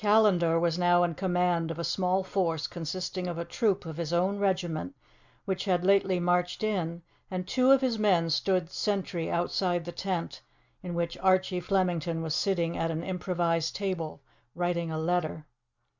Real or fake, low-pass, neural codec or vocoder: real; 7.2 kHz; none